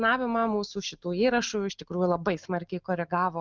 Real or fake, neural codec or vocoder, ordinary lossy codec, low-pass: real; none; Opus, 24 kbps; 7.2 kHz